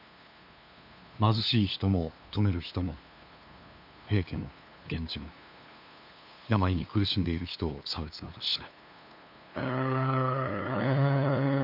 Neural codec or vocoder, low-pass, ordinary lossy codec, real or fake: codec, 16 kHz, 2 kbps, FunCodec, trained on LibriTTS, 25 frames a second; 5.4 kHz; none; fake